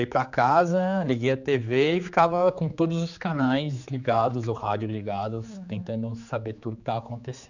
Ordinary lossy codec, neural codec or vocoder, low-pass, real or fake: none; codec, 16 kHz, 4 kbps, X-Codec, HuBERT features, trained on general audio; 7.2 kHz; fake